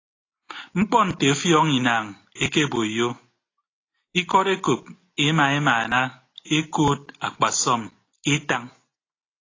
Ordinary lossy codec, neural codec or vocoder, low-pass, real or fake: AAC, 32 kbps; none; 7.2 kHz; real